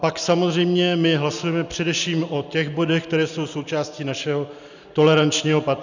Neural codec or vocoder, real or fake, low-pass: none; real; 7.2 kHz